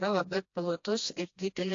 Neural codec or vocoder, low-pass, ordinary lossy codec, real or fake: codec, 16 kHz, 1 kbps, FreqCodec, smaller model; 7.2 kHz; AAC, 48 kbps; fake